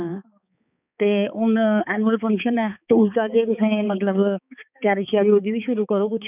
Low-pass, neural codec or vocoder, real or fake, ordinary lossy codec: 3.6 kHz; codec, 16 kHz, 4 kbps, X-Codec, HuBERT features, trained on balanced general audio; fake; none